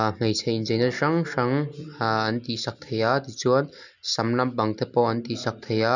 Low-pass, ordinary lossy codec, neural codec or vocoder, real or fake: 7.2 kHz; none; none; real